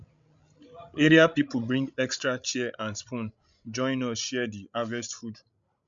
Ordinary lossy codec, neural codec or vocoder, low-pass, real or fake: MP3, 64 kbps; codec, 16 kHz, 16 kbps, FreqCodec, larger model; 7.2 kHz; fake